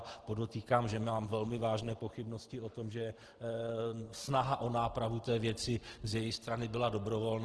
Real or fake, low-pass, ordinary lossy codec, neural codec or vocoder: real; 10.8 kHz; Opus, 16 kbps; none